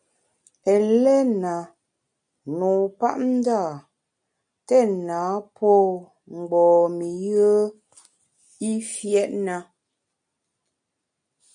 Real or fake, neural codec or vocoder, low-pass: real; none; 9.9 kHz